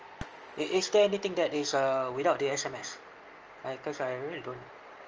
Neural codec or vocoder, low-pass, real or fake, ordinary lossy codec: none; 7.2 kHz; real; Opus, 24 kbps